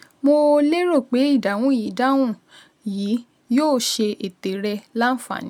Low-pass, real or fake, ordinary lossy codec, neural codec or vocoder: 19.8 kHz; real; none; none